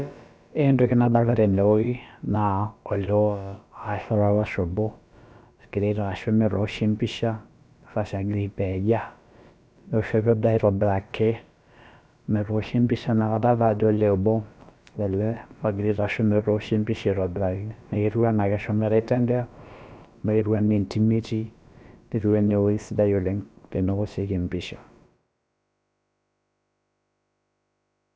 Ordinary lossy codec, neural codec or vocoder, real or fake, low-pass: none; codec, 16 kHz, about 1 kbps, DyCAST, with the encoder's durations; fake; none